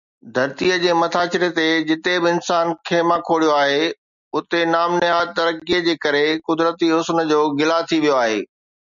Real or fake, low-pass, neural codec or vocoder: real; 7.2 kHz; none